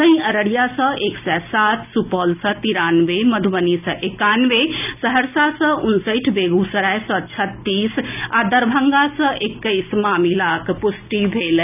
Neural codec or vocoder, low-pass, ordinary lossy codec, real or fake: none; 3.6 kHz; none; real